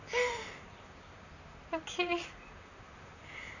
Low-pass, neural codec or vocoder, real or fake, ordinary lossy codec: 7.2 kHz; vocoder, 44.1 kHz, 128 mel bands, Pupu-Vocoder; fake; none